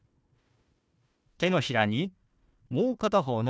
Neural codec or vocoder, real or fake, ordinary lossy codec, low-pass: codec, 16 kHz, 1 kbps, FunCodec, trained on Chinese and English, 50 frames a second; fake; none; none